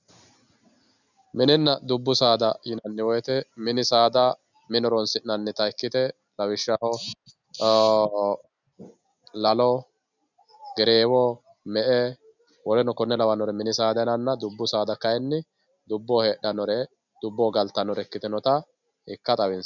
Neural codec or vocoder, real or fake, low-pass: none; real; 7.2 kHz